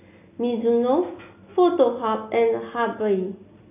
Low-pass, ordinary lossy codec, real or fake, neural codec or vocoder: 3.6 kHz; none; real; none